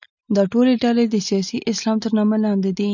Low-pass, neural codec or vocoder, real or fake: 7.2 kHz; none; real